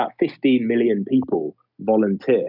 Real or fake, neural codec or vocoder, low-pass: real; none; 5.4 kHz